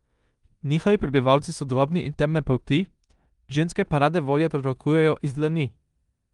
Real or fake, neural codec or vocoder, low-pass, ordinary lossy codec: fake; codec, 16 kHz in and 24 kHz out, 0.9 kbps, LongCat-Audio-Codec, four codebook decoder; 10.8 kHz; Opus, 32 kbps